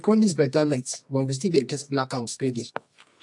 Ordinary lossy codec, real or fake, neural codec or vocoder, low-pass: AAC, 64 kbps; fake; codec, 24 kHz, 0.9 kbps, WavTokenizer, medium music audio release; 10.8 kHz